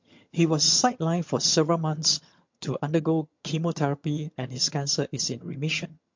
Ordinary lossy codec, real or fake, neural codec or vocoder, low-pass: MP3, 48 kbps; fake; vocoder, 22.05 kHz, 80 mel bands, HiFi-GAN; 7.2 kHz